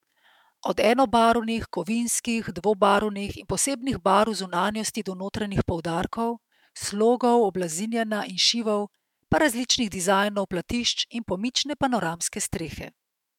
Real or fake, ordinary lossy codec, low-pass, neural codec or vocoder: fake; MP3, 96 kbps; 19.8 kHz; autoencoder, 48 kHz, 128 numbers a frame, DAC-VAE, trained on Japanese speech